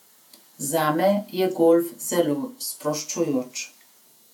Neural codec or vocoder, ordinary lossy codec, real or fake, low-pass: vocoder, 48 kHz, 128 mel bands, Vocos; none; fake; 19.8 kHz